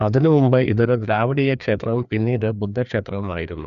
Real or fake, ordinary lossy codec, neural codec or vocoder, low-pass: fake; none; codec, 16 kHz, 2 kbps, FreqCodec, larger model; 7.2 kHz